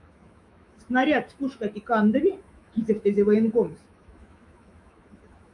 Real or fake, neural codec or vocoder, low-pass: fake; autoencoder, 48 kHz, 128 numbers a frame, DAC-VAE, trained on Japanese speech; 10.8 kHz